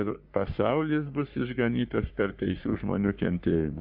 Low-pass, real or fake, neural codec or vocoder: 5.4 kHz; fake; codec, 44.1 kHz, 3.4 kbps, Pupu-Codec